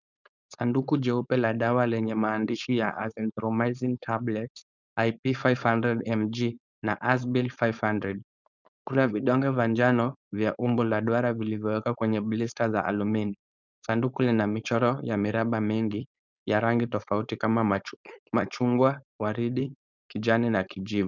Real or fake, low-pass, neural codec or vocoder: fake; 7.2 kHz; codec, 16 kHz, 4.8 kbps, FACodec